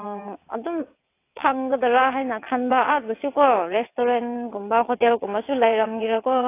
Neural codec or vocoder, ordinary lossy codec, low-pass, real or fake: vocoder, 22.05 kHz, 80 mel bands, WaveNeXt; AAC, 24 kbps; 3.6 kHz; fake